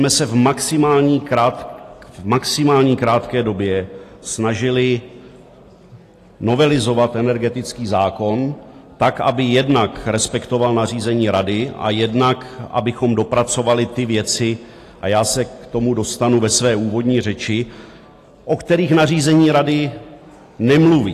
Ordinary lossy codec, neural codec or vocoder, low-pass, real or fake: AAC, 48 kbps; none; 14.4 kHz; real